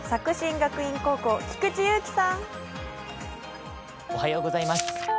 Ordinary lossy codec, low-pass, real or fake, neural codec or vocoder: none; none; real; none